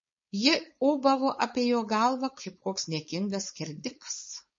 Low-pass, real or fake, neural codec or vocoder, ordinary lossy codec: 7.2 kHz; fake; codec, 16 kHz, 4.8 kbps, FACodec; MP3, 32 kbps